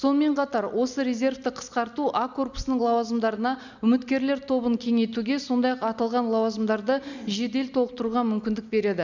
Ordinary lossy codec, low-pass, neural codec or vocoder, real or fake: none; 7.2 kHz; none; real